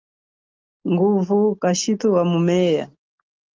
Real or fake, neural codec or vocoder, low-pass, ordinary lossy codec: real; none; 7.2 kHz; Opus, 24 kbps